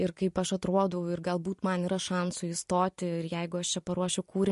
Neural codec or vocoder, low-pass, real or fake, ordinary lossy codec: none; 14.4 kHz; real; MP3, 48 kbps